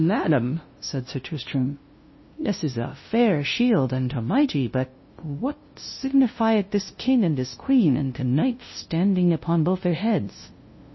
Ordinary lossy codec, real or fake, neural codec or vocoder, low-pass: MP3, 24 kbps; fake; codec, 16 kHz, 0.5 kbps, FunCodec, trained on LibriTTS, 25 frames a second; 7.2 kHz